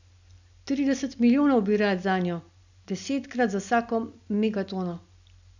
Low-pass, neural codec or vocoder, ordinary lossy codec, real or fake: 7.2 kHz; none; none; real